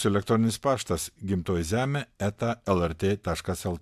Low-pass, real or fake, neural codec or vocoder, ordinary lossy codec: 14.4 kHz; real; none; AAC, 96 kbps